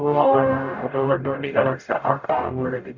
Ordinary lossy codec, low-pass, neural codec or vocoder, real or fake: Opus, 64 kbps; 7.2 kHz; codec, 44.1 kHz, 0.9 kbps, DAC; fake